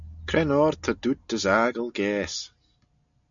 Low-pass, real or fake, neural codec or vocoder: 7.2 kHz; real; none